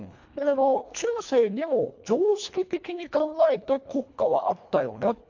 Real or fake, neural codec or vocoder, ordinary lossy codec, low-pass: fake; codec, 24 kHz, 1.5 kbps, HILCodec; MP3, 48 kbps; 7.2 kHz